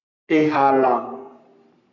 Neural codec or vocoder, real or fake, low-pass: codec, 44.1 kHz, 3.4 kbps, Pupu-Codec; fake; 7.2 kHz